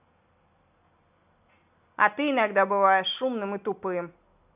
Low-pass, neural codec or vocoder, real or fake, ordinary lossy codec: 3.6 kHz; none; real; none